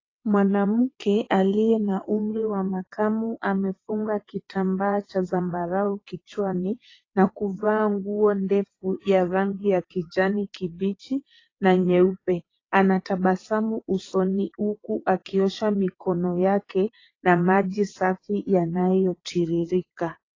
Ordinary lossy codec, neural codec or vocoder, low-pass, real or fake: AAC, 32 kbps; vocoder, 22.05 kHz, 80 mel bands, WaveNeXt; 7.2 kHz; fake